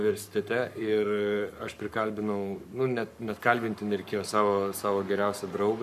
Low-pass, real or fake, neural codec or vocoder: 14.4 kHz; fake; codec, 44.1 kHz, 7.8 kbps, Pupu-Codec